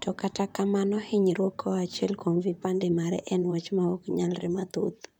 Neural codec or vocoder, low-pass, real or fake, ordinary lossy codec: vocoder, 44.1 kHz, 128 mel bands, Pupu-Vocoder; none; fake; none